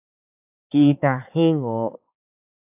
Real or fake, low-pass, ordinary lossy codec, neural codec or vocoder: fake; 3.6 kHz; AAC, 32 kbps; codec, 16 kHz, 4 kbps, X-Codec, HuBERT features, trained on balanced general audio